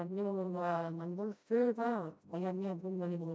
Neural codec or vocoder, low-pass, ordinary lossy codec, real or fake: codec, 16 kHz, 0.5 kbps, FreqCodec, smaller model; none; none; fake